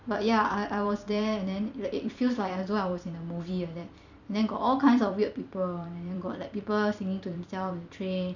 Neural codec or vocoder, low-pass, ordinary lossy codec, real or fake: vocoder, 44.1 kHz, 128 mel bands every 256 samples, BigVGAN v2; 7.2 kHz; none; fake